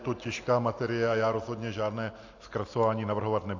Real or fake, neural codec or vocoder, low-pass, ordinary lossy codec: real; none; 7.2 kHz; AAC, 48 kbps